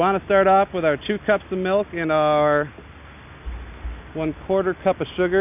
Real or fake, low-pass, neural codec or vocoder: real; 3.6 kHz; none